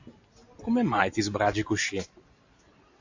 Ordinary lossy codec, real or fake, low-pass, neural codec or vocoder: AAC, 48 kbps; real; 7.2 kHz; none